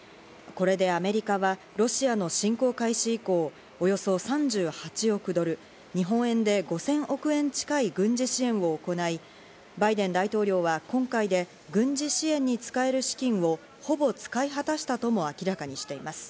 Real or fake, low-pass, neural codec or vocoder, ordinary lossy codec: real; none; none; none